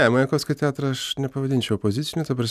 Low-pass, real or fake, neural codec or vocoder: 14.4 kHz; real; none